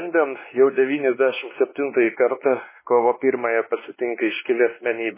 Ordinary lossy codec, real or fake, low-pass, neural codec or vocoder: MP3, 16 kbps; fake; 3.6 kHz; codec, 16 kHz, 2 kbps, X-Codec, WavLM features, trained on Multilingual LibriSpeech